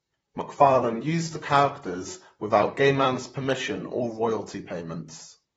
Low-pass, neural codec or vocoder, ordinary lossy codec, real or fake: 19.8 kHz; vocoder, 44.1 kHz, 128 mel bands, Pupu-Vocoder; AAC, 24 kbps; fake